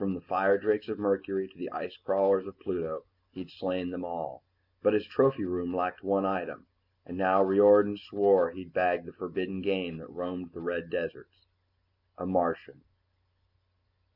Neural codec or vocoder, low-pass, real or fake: none; 5.4 kHz; real